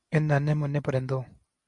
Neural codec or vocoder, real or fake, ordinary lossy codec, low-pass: none; real; AAC, 64 kbps; 10.8 kHz